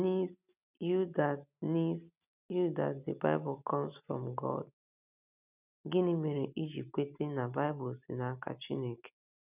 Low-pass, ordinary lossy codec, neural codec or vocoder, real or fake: 3.6 kHz; none; none; real